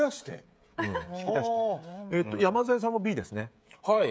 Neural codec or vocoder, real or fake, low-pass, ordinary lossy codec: codec, 16 kHz, 16 kbps, FreqCodec, smaller model; fake; none; none